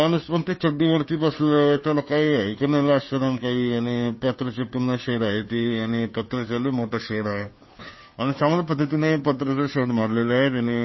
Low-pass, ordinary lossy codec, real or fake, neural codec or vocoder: 7.2 kHz; MP3, 24 kbps; fake; codec, 16 kHz, 2 kbps, FunCodec, trained on Chinese and English, 25 frames a second